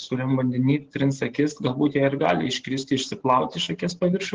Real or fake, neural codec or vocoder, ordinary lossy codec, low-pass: real; none; Opus, 16 kbps; 7.2 kHz